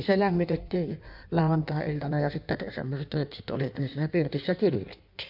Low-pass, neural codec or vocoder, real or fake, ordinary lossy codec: 5.4 kHz; codec, 16 kHz in and 24 kHz out, 1.1 kbps, FireRedTTS-2 codec; fake; none